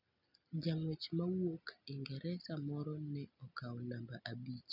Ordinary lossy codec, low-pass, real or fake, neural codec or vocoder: none; 5.4 kHz; real; none